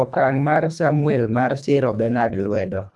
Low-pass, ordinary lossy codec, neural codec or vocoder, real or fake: none; none; codec, 24 kHz, 1.5 kbps, HILCodec; fake